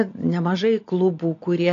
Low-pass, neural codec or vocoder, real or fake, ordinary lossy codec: 7.2 kHz; none; real; AAC, 48 kbps